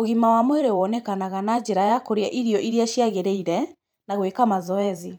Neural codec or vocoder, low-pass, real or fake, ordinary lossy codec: vocoder, 44.1 kHz, 128 mel bands every 512 samples, BigVGAN v2; none; fake; none